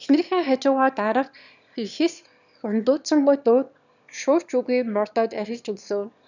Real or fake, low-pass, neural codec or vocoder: fake; 7.2 kHz; autoencoder, 22.05 kHz, a latent of 192 numbers a frame, VITS, trained on one speaker